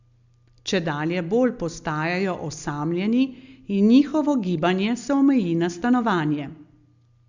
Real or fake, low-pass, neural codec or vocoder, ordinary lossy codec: real; 7.2 kHz; none; Opus, 64 kbps